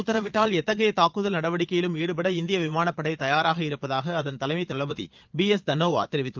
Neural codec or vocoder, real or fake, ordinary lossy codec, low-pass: vocoder, 22.05 kHz, 80 mel bands, Vocos; fake; Opus, 32 kbps; 7.2 kHz